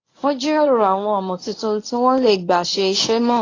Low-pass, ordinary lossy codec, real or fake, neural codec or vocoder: 7.2 kHz; AAC, 32 kbps; fake; codec, 24 kHz, 0.9 kbps, WavTokenizer, medium speech release version 1